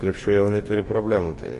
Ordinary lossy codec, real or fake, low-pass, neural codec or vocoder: MP3, 48 kbps; fake; 14.4 kHz; codec, 44.1 kHz, 2.6 kbps, DAC